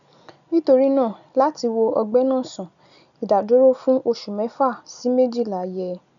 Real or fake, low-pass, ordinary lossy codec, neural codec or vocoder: real; 7.2 kHz; none; none